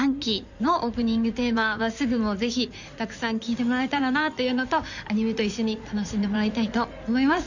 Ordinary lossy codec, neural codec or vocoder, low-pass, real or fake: none; codec, 16 kHz in and 24 kHz out, 2.2 kbps, FireRedTTS-2 codec; 7.2 kHz; fake